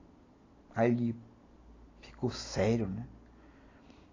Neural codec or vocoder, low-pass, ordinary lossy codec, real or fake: none; 7.2 kHz; AAC, 32 kbps; real